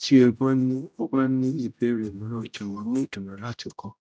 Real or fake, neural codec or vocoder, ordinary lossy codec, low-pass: fake; codec, 16 kHz, 0.5 kbps, X-Codec, HuBERT features, trained on general audio; none; none